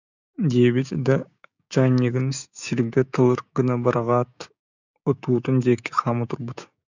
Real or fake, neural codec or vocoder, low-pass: fake; codec, 16 kHz, 6 kbps, DAC; 7.2 kHz